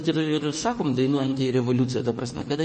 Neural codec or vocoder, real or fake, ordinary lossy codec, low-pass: autoencoder, 48 kHz, 32 numbers a frame, DAC-VAE, trained on Japanese speech; fake; MP3, 32 kbps; 10.8 kHz